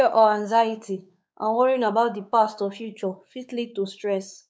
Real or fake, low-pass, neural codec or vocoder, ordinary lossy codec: fake; none; codec, 16 kHz, 4 kbps, X-Codec, WavLM features, trained on Multilingual LibriSpeech; none